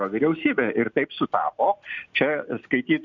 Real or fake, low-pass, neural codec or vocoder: real; 7.2 kHz; none